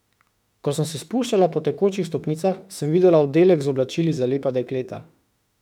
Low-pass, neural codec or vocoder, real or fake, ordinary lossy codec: 19.8 kHz; autoencoder, 48 kHz, 32 numbers a frame, DAC-VAE, trained on Japanese speech; fake; none